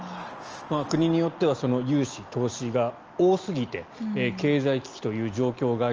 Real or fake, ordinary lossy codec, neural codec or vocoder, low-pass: real; Opus, 24 kbps; none; 7.2 kHz